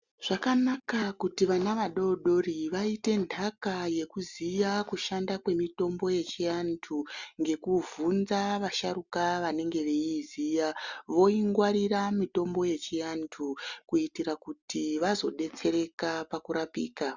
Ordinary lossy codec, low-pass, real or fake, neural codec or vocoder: Opus, 64 kbps; 7.2 kHz; real; none